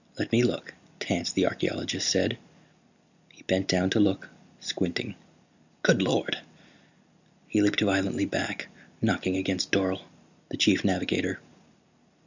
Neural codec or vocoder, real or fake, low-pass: none; real; 7.2 kHz